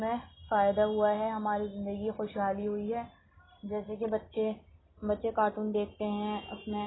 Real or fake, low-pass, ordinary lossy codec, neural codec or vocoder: real; 7.2 kHz; AAC, 16 kbps; none